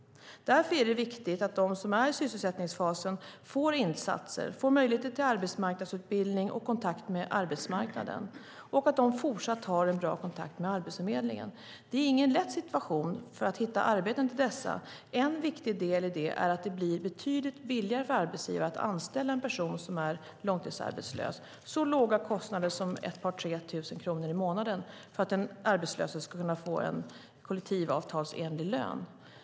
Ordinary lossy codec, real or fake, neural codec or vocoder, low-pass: none; real; none; none